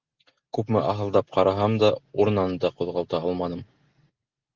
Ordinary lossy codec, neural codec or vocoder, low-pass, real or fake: Opus, 16 kbps; none; 7.2 kHz; real